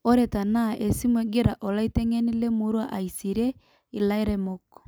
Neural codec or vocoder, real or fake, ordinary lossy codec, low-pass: none; real; none; none